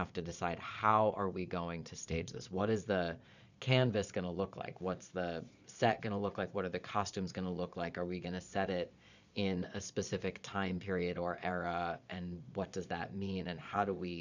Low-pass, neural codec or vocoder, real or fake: 7.2 kHz; vocoder, 22.05 kHz, 80 mel bands, Vocos; fake